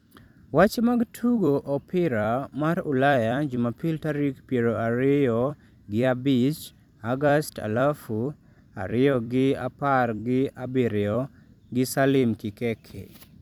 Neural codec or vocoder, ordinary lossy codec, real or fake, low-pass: vocoder, 44.1 kHz, 128 mel bands every 256 samples, BigVGAN v2; none; fake; 19.8 kHz